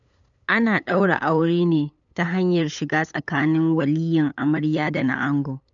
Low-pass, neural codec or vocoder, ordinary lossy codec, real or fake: 7.2 kHz; codec, 16 kHz, 8 kbps, FunCodec, trained on LibriTTS, 25 frames a second; none; fake